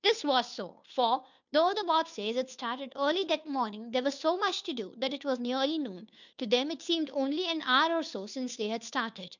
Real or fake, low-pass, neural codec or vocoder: fake; 7.2 kHz; codec, 16 kHz, 2 kbps, FunCodec, trained on Chinese and English, 25 frames a second